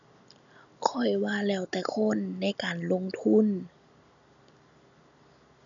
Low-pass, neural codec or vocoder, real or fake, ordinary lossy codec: 7.2 kHz; none; real; none